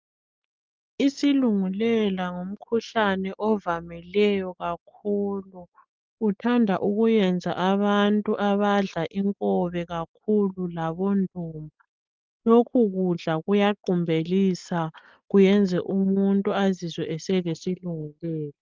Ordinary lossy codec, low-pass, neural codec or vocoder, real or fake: Opus, 32 kbps; 7.2 kHz; none; real